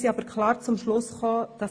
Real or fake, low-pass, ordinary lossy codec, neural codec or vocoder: real; 9.9 kHz; AAC, 48 kbps; none